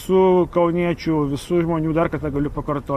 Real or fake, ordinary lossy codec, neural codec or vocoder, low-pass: real; MP3, 64 kbps; none; 14.4 kHz